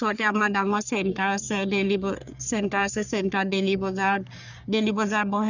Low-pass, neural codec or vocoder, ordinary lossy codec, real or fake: 7.2 kHz; codec, 44.1 kHz, 3.4 kbps, Pupu-Codec; none; fake